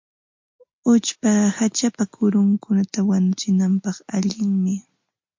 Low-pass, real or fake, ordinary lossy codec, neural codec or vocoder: 7.2 kHz; real; MP3, 48 kbps; none